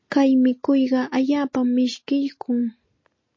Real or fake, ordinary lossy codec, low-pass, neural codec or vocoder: real; MP3, 32 kbps; 7.2 kHz; none